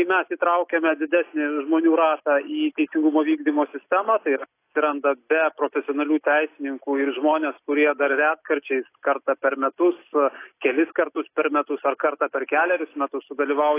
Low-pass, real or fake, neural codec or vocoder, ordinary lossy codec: 3.6 kHz; real; none; AAC, 24 kbps